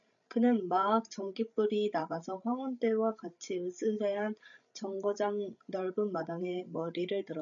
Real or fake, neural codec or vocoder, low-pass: fake; codec, 16 kHz, 16 kbps, FreqCodec, larger model; 7.2 kHz